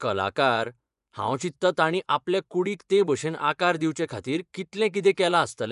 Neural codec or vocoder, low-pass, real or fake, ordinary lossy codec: vocoder, 24 kHz, 100 mel bands, Vocos; 10.8 kHz; fake; none